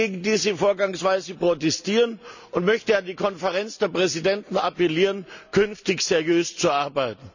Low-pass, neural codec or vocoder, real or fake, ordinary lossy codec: 7.2 kHz; none; real; none